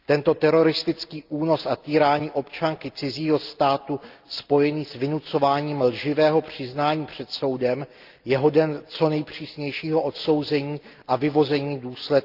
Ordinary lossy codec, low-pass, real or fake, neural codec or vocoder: Opus, 24 kbps; 5.4 kHz; real; none